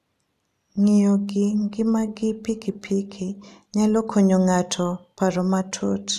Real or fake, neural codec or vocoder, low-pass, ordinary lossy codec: real; none; 14.4 kHz; none